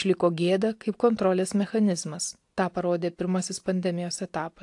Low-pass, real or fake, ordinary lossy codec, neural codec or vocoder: 10.8 kHz; real; AAC, 64 kbps; none